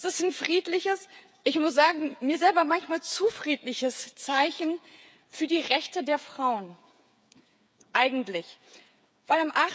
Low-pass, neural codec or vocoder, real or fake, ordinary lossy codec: none; codec, 16 kHz, 8 kbps, FreqCodec, smaller model; fake; none